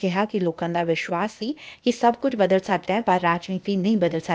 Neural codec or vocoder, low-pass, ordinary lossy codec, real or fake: codec, 16 kHz, 0.8 kbps, ZipCodec; none; none; fake